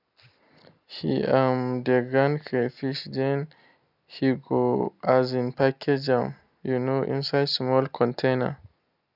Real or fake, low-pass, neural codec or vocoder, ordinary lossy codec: real; 5.4 kHz; none; none